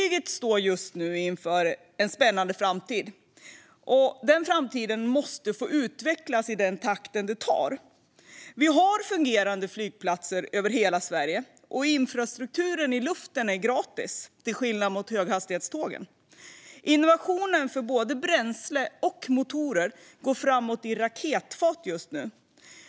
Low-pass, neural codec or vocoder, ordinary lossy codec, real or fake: none; none; none; real